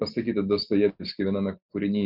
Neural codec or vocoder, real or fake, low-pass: none; real; 5.4 kHz